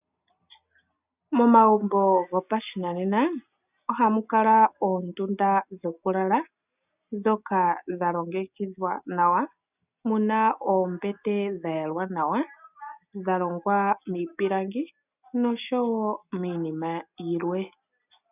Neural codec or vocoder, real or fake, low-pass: none; real; 3.6 kHz